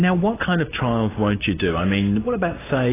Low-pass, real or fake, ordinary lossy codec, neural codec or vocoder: 3.6 kHz; real; AAC, 16 kbps; none